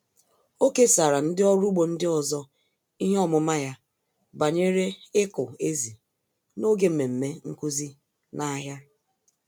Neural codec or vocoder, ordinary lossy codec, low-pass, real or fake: none; none; none; real